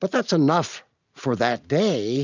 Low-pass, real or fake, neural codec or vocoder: 7.2 kHz; real; none